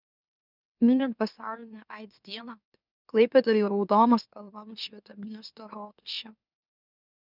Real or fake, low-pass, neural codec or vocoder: fake; 5.4 kHz; autoencoder, 44.1 kHz, a latent of 192 numbers a frame, MeloTTS